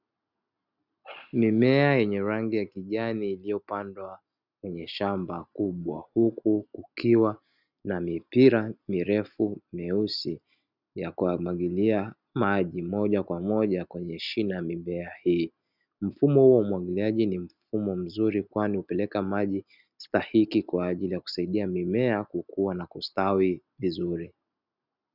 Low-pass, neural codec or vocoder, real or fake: 5.4 kHz; none; real